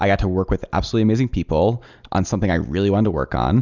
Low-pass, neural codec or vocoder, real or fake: 7.2 kHz; none; real